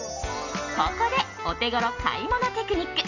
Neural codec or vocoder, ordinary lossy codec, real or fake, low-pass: none; none; real; 7.2 kHz